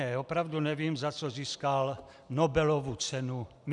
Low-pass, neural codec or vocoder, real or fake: 10.8 kHz; none; real